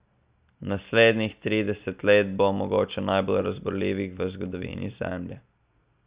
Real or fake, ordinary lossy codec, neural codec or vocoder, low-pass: real; Opus, 64 kbps; none; 3.6 kHz